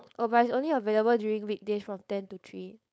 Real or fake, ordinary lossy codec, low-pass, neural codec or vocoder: fake; none; none; codec, 16 kHz, 4.8 kbps, FACodec